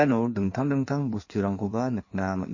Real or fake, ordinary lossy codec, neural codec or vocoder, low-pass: fake; MP3, 32 kbps; codec, 16 kHz in and 24 kHz out, 1.1 kbps, FireRedTTS-2 codec; 7.2 kHz